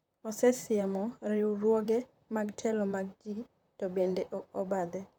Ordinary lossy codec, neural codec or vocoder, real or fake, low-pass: none; vocoder, 44.1 kHz, 128 mel bands, Pupu-Vocoder; fake; 19.8 kHz